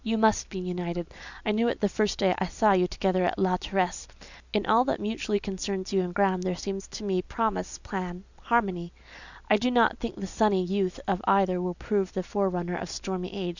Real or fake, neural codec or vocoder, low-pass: real; none; 7.2 kHz